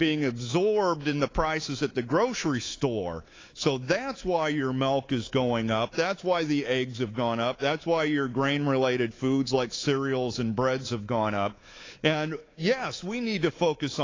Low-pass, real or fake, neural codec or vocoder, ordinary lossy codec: 7.2 kHz; fake; codec, 24 kHz, 3.1 kbps, DualCodec; AAC, 32 kbps